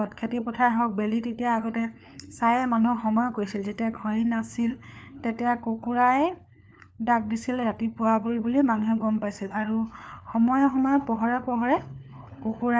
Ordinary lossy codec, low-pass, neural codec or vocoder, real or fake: none; none; codec, 16 kHz, 4 kbps, FunCodec, trained on LibriTTS, 50 frames a second; fake